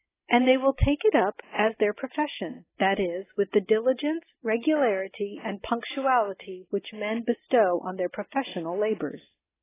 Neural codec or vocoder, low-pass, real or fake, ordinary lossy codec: none; 3.6 kHz; real; AAC, 16 kbps